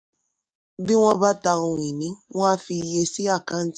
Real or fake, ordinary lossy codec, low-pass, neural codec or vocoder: fake; none; 9.9 kHz; codec, 44.1 kHz, 7.8 kbps, DAC